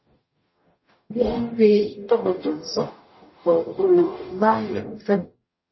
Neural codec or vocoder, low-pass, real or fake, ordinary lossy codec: codec, 44.1 kHz, 0.9 kbps, DAC; 7.2 kHz; fake; MP3, 24 kbps